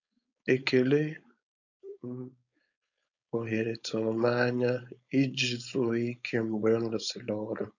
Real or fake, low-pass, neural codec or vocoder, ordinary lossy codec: fake; 7.2 kHz; codec, 16 kHz, 4.8 kbps, FACodec; none